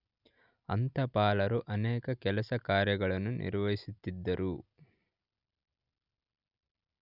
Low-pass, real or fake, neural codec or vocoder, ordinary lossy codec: 5.4 kHz; real; none; none